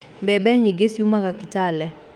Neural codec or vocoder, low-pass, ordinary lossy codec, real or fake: autoencoder, 48 kHz, 32 numbers a frame, DAC-VAE, trained on Japanese speech; 19.8 kHz; Opus, 32 kbps; fake